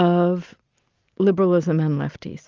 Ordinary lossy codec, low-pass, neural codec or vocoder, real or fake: Opus, 32 kbps; 7.2 kHz; none; real